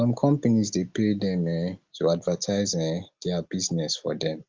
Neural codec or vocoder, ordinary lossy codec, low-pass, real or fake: none; Opus, 32 kbps; 7.2 kHz; real